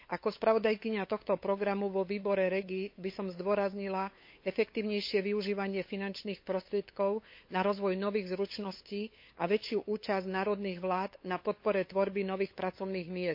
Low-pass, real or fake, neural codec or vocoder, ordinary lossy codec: 5.4 kHz; fake; codec, 16 kHz, 8 kbps, FunCodec, trained on LibriTTS, 25 frames a second; MP3, 32 kbps